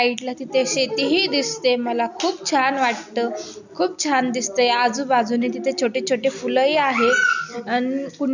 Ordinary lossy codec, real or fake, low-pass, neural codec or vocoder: none; real; 7.2 kHz; none